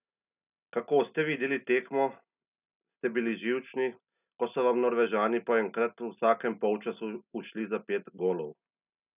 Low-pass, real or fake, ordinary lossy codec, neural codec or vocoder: 3.6 kHz; real; none; none